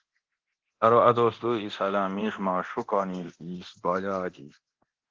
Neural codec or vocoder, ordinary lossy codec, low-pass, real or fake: codec, 24 kHz, 0.9 kbps, DualCodec; Opus, 16 kbps; 7.2 kHz; fake